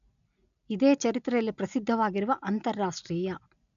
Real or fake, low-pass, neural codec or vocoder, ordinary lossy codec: real; 7.2 kHz; none; none